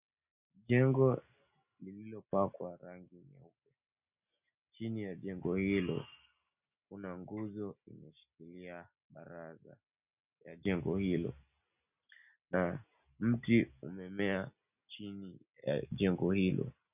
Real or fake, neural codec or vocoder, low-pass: real; none; 3.6 kHz